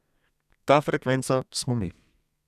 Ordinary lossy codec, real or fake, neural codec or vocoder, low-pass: none; fake; codec, 32 kHz, 1.9 kbps, SNAC; 14.4 kHz